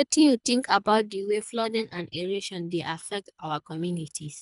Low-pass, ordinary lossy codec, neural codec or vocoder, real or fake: 10.8 kHz; none; codec, 24 kHz, 3 kbps, HILCodec; fake